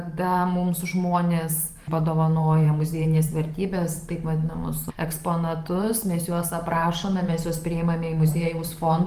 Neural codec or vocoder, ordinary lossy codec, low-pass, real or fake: vocoder, 44.1 kHz, 128 mel bands every 512 samples, BigVGAN v2; Opus, 32 kbps; 14.4 kHz; fake